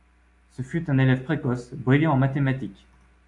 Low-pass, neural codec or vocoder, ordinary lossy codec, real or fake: 10.8 kHz; none; AAC, 64 kbps; real